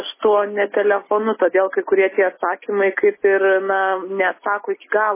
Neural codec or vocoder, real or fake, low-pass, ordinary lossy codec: none; real; 3.6 kHz; MP3, 16 kbps